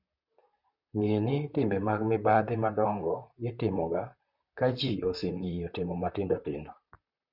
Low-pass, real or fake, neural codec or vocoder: 5.4 kHz; fake; vocoder, 44.1 kHz, 128 mel bands, Pupu-Vocoder